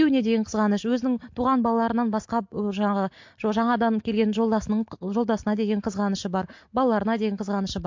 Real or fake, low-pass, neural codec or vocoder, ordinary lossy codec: real; 7.2 kHz; none; MP3, 48 kbps